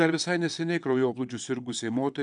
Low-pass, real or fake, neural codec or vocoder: 9.9 kHz; fake; vocoder, 22.05 kHz, 80 mel bands, WaveNeXt